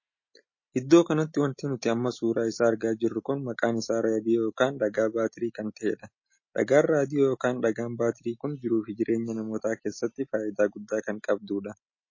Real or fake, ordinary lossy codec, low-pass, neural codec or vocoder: real; MP3, 32 kbps; 7.2 kHz; none